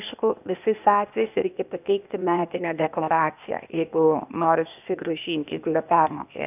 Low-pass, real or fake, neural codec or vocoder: 3.6 kHz; fake; codec, 16 kHz, 0.8 kbps, ZipCodec